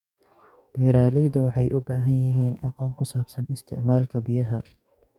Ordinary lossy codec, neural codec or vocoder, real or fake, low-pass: none; codec, 44.1 kHz, 2.6 kbps, DAC; fake; 19.8 kHz